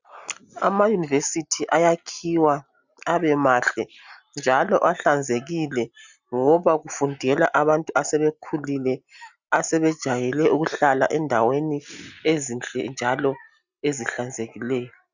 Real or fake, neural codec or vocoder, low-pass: real; none; 7.2 kHz